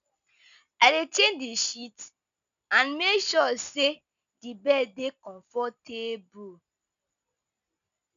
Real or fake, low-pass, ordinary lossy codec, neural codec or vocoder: real; 7.2 kHz; none; none